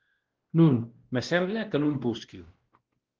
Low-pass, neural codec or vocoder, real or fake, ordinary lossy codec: 7.2 kHz; codec, 16 kHz, 1 kbps, X-Codec, WavLM features, trained on Multilingual LibriSpeech; fake; Opus, 16 kbps